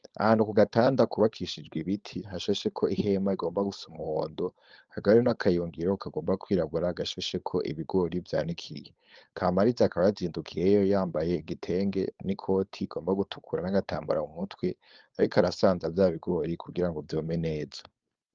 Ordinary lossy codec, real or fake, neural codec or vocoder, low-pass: Opus, 24 kbps; fake; codec, 16 kHz, 4.8 kbps, FACodec; 7.2 kHz